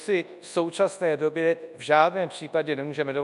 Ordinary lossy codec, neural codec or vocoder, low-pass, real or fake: MP3, 96 kbps; codec, 24 kHz, 0.9 kbps, WavTokenizer, large speech release; 10.8 kHz; fake